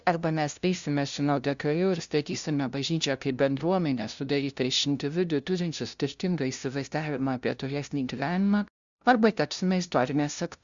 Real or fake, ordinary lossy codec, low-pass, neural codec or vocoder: fake; Opus, 64 kbps; 7.2 kHz; codec, 16 kHz, 0.5 kbps, FunCodec, trained on LibriTTS, 25 frames a second